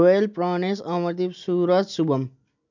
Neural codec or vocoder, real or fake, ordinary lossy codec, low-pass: none; real; none; 7.2 kHz